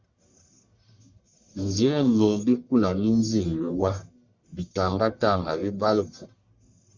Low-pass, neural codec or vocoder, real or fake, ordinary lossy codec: 7.2 kHz; codec, 44.1 kHz, 1.7 kbps, Pupu-Codec; fake; Opus, 64 kbps